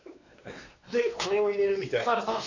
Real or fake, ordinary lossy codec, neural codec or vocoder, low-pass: fake; none; codec, 16 kHz, 2 kbps, X-Codec, WavLM features, trained on Multilingual LibriSpeech; 7.2 kHz